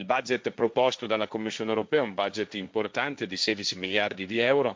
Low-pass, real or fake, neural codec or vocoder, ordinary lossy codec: none; fake; codec, 16 kHz, 1.1 kbps, Voila-Tokenizer; none